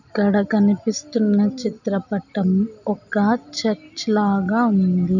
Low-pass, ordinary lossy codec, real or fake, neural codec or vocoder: 7.2 kHz; none; real; none